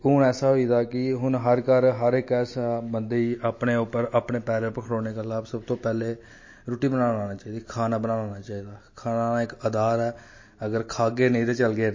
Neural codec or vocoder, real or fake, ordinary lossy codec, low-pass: none; real; MP3, 32 kbps; 7.2 kHz